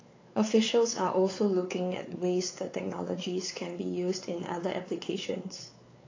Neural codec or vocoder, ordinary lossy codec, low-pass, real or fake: codec, 16 kHz, 4 kbps, X-Codec, WavLM features, trained on Multilingual LibriSpeech; AAC, 32 kbps; 7.2 kHz; fake